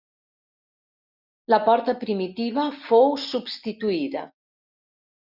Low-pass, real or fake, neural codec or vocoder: 5.4 kHz; real; none